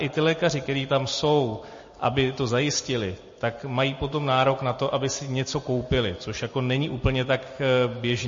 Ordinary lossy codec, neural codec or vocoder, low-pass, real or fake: MP3, 32 kbps; none; 7.2 kHz; real